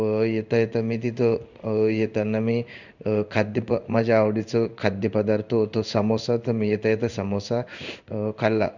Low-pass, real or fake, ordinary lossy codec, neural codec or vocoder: 7.2 kHz; fake; none; codec, 16 kHz in and 24 kHz out, 1 kbps, XY-Tokenizer